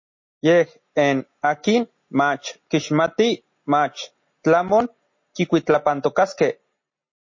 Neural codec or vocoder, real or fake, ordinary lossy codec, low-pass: none; real; MP3, 32 kbps; 7.2 kHz